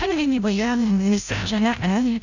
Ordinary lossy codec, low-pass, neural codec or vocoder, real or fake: none; 7.2 kHz; codec, 16 kHz, 0.5 kbps, FreqCodec, larger model; fake